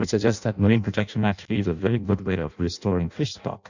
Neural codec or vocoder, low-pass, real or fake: codec, 16 kHz in and 24 kHz out, 0.6 kbps, FireRedTTS-2 codec; 7.2 kHz; fake